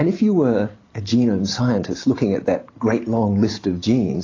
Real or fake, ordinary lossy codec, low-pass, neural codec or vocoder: real; AAC, 32 kbps; 7.2 kHz; none